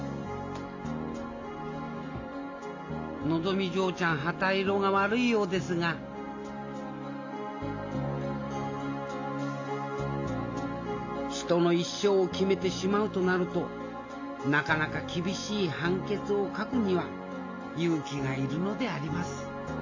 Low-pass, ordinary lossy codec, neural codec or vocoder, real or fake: 7.2 kHz; AAC, 48 kbps; none; real